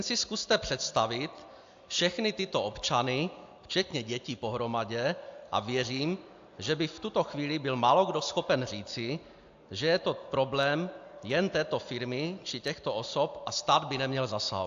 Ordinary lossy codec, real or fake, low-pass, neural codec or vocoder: AAC, 64 kbps; real; 7.2 kHz; none